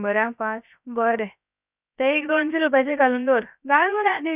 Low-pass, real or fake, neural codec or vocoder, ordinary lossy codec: 3.6 kHz; fake; codec, 16 kHz, 0.7 kbps, FocalCodec; none